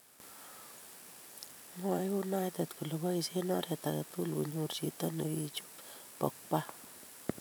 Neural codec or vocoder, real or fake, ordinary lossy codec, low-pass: vocoder, 44.1 kHz, 128 mel bands every 512 samples, BigVGAN v2; fake; none; none